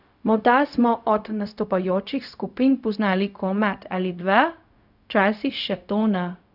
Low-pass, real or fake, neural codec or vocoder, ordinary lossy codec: 5.4 kHz; fake; codec, 16 kHz, 0.4 kbps, LongCat-Audio-Codec; none